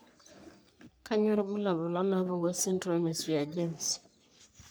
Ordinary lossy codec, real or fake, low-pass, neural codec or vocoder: none; fake; none; codec, 44.1 kHz, 3.4 kbps, Pupu-Codec